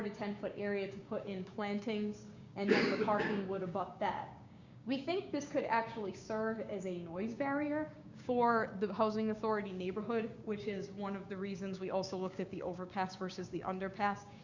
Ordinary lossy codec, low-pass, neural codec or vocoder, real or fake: Opus, 64 kbps; 7.2 kHz; codec, 16 kHz, 6 kbps, DAC; fake